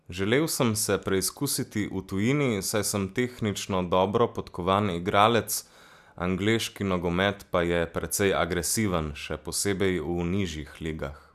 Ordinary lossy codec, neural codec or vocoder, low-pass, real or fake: none; none; 14.4 kHz; real